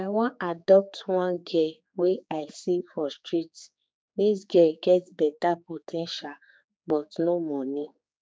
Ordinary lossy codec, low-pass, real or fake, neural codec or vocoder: none; none; fake; codec, 16 kHz, 4 kbps, X-Codec, HuBERT features, trained on general audio